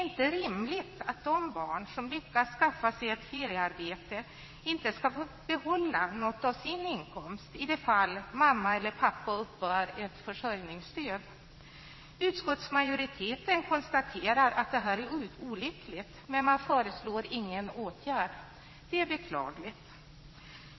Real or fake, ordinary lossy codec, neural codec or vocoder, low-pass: fake; MP3, 24 kbps; vocoder, 22.05 kHz, 80 mel bands, Vocos; 7.2 kHz